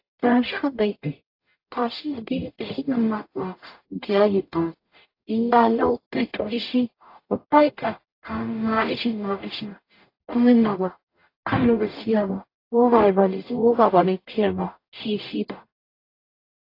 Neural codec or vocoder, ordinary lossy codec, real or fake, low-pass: codec, 44.1 kHz, 0.9 kbps, DAC; AAC, 32 kbps; fake; 5.4 kHz